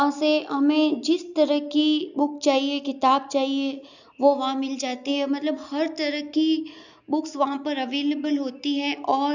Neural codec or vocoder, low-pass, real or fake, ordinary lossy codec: none; 7.2 kHz; real; none